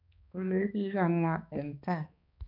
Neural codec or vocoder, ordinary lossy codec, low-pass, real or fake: codec, 16 kHz, 2 kbps, X-Codec, HuBERT features, trained on balanced general audio; none; 5.4 kHz; fake